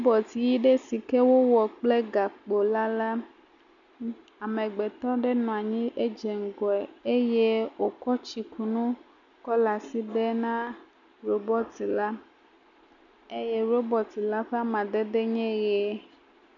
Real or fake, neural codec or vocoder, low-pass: real; none; 7.2 kHz